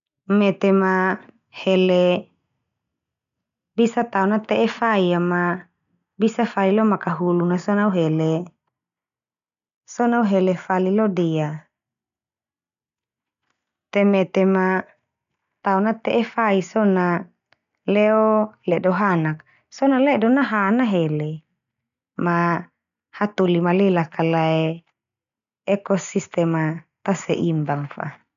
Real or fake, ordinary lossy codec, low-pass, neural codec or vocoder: real; none; 7.2 kHz; none